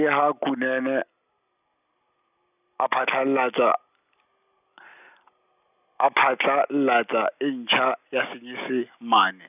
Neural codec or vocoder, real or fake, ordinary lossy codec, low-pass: none; real; none; 3.6 kHz